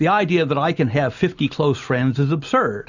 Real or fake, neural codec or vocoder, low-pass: real; none; 7.2 kHz